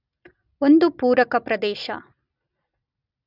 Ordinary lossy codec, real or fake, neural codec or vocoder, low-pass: none; real; none; 5.4 kHz